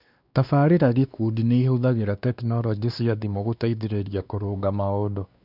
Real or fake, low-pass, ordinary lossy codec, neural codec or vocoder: fake; 5.4 kHz; none; codec, 16 kHz, 2 kbps, X-Codec, WavLM features, trained on Multilingual LibriSpeech